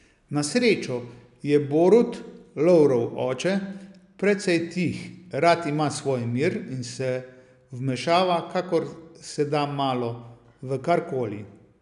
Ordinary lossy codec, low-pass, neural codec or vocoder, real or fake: none; 10.8 kHz; none; real